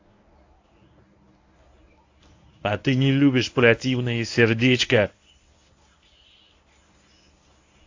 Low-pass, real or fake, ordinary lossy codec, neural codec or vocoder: 7.2 kHz; fake; AAC, 48 kbps; codec, 24 kHz, 0.9 kbps, WavTokenizer, medium speech release version 1